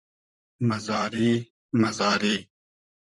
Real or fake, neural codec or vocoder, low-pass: fake; vocoder, 44.1 kHz, 128 mel bands, Pupu-Vocoder; 10.8 kHz